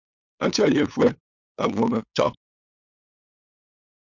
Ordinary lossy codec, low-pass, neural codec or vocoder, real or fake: MP3, 64 kbps; 7.2 kHz; codec, 16 kHz, 8 kbps, FunCodec, trained on LibriTTS, 25 frames a second; fake